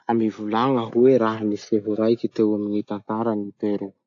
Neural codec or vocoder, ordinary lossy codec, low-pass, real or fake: none; AAC, 48 kbps; 7.2 kHz; real